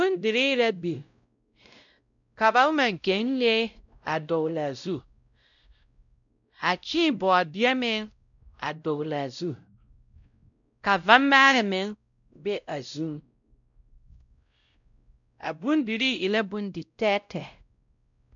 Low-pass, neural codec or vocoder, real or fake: 7.2 kHz; codec, 16 kHz, 0.5 kbps, X-Codec, WavLM features, trained on Multilingual LibriSpeech; fake